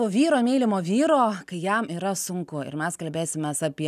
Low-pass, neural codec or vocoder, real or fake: 14.4 kHz; none; real